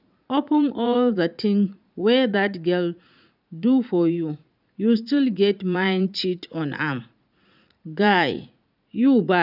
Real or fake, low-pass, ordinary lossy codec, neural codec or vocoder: fake; 5.4 kHz; none; vocoder, 44.1 kHz, 80 mel bands, Vocos